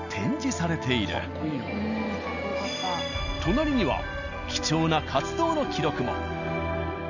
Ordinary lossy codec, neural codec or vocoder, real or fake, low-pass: none; none; real; 7.2 kHz